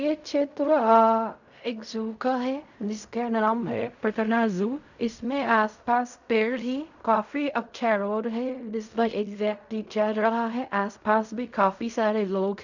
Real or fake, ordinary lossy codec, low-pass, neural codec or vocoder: fake; none; 7.2 kHz; codec, 16 kHz in and 24 kHz out, 0.4 kbps, LongCat-Audio-Codec, fine tuned four codebook decoder